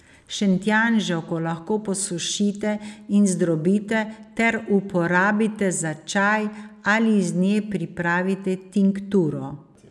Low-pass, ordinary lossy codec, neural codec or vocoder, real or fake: none; none; none; real